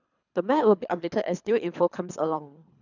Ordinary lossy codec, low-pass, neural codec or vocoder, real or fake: none; 7.2 kHz; codec, 24 kHz, 3 kbps, HILCodec; fake